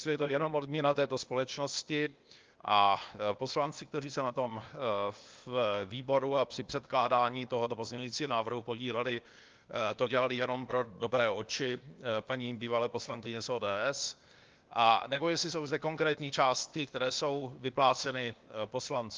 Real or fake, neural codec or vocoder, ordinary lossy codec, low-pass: fake; codec, 16 kHz, 0.8 kbps, ZipCodec; Opus, 24 kbps; 7.2 kHz